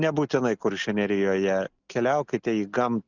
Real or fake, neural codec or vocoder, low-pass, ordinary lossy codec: real; none; 7.2 kHz; Opus, 64 kbps